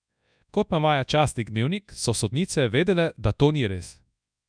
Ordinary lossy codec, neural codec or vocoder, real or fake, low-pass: none; codec, 24 kHz, 0.9 kbps, WavTokenizer, large speech release; fake; 9.9 kHz